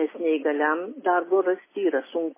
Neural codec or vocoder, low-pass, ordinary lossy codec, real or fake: none; 3.6 kHz; MP3, 16 kbps; real